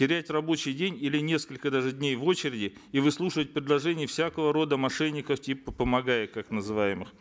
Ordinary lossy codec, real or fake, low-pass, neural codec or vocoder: none; real; none; none